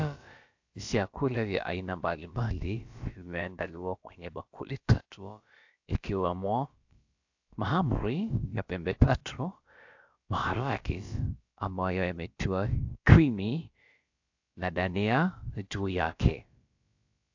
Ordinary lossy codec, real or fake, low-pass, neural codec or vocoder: AAC, 48 kbps; fake; 7.2 kHz; codec, 16 kHz, about 1 kbps, DyCAST, with the encoder's durations